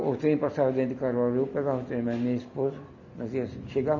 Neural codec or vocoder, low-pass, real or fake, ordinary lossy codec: none; 7.2 kHz; real; none